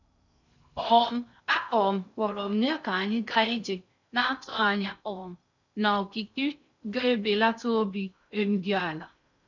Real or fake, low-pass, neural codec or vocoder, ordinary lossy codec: fake; 7.2 kHz; codec, 16 kHz in and 24 kHz out, 0.6 kbps, FocalCodec, streaming, 2048 codes; none